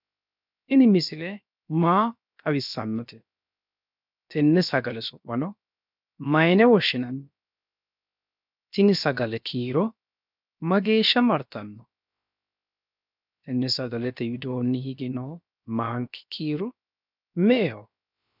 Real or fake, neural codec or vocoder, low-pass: fake; codec, 16 kHz, 0.7 kbps, FocalCodec; 5.4 kHz